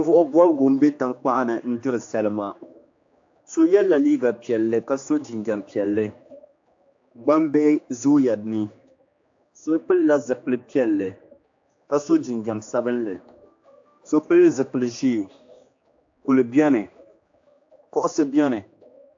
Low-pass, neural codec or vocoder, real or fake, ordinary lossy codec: 7.2 kHz; codec, 16 kHz, 2 kbps, X-Codec, HuBERT features, trained on general audio; fake; AAC, 48 kbps